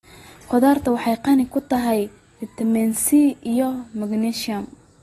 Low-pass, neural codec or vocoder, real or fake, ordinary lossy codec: 19.8 kHz; none; real; AAC, 32 kbps